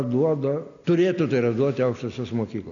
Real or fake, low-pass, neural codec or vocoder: real; 7.2 kHz; none